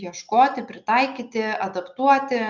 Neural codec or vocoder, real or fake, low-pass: none; real; 7.2 kHz